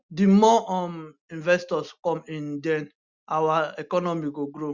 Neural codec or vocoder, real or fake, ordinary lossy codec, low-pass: none; real; Opus, 64 kbps; 7.2 kHz